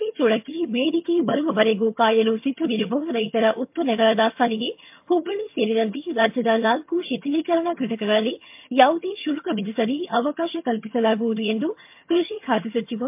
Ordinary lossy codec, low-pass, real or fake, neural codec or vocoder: MP3, 32 kbps; 3.6 kHz; fake; vocoder, 22.05 kHz, 80 mel bands, HiFi-GAN